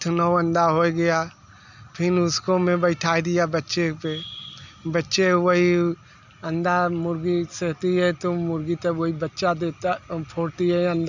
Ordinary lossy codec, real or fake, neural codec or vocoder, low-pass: none; real; none; 7.2 kHz